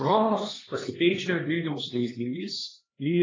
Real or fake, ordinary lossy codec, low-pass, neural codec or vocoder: fake; AAC, 32 kbps; 7.2 kHz; codec, 16 kHz, 2 kbps, X-Codec, HuBERT features, trained on LibriSpeech